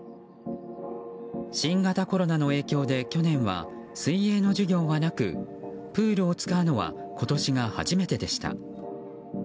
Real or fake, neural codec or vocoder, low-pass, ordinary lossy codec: real; none; none; none